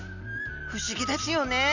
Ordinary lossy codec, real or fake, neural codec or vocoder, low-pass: none; real; none; 7.2 kHz